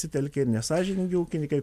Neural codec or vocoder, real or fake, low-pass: none; real; 14.4 kHz